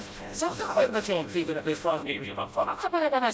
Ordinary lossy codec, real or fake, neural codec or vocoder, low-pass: none; fake; codec, 16 kHz, 0.5 kbps, FreqCodec, smaller model; none